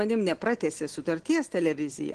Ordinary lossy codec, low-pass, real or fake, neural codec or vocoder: Opus, 16 kbps; 9.9 kHz; real; none